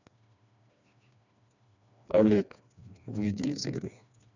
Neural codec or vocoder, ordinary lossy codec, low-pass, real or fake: codec, 16 kHz, 2 kbps, FreqCodec, smaller model; none; 7.2 kHz; fake